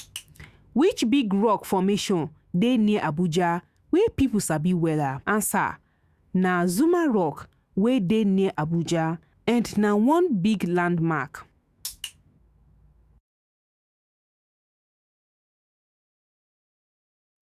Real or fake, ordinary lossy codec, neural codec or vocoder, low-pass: fake; Opus, 64 kbps; autoencoder, 48 kHz, 128 numbers a frame, DAC-VAE, trained on Japanese speech; 14.4 kHz